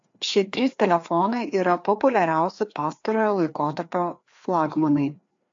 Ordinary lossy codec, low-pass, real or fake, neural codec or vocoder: AAC, 64 kbps; 7.2 kHz; fake; codec, 16 kHz, 2 kbps, FreqCodec, larger model